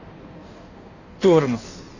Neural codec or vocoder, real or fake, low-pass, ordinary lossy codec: codec, 16 kHz in and 24 kHz out, 1.1 kbps, FireRedTTS-2 codec; fake; 7.2 kHz; none